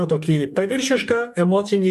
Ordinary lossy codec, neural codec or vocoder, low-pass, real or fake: MP3, 96 kbps; codec, 44.1 kHz, 2.6 kbps, DAC; 14.4 kHz; fake